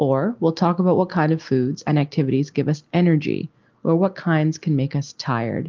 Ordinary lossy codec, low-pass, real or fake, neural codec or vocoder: Opus, 32 kbps; 7.2 kHz; real; none